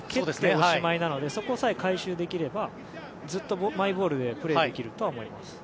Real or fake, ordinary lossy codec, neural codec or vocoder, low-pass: real; none; none; none